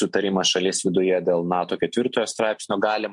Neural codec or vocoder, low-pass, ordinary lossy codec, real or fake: none; 10.8 kHz; MP3, 64 kbps; real